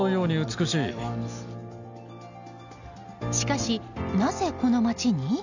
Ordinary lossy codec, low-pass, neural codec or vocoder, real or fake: none; 7.2 kHz; none; real